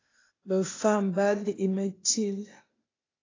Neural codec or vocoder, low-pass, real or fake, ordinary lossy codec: codec, 16 kHz, 0.8 kbps, ZipCodec; 7.2 kHz; fake; AAC, 32 kbps